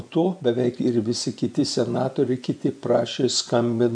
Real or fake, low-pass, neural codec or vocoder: fake; 9.9 kHz; vocoder, 24 kHz, 100 mel bands, Vocos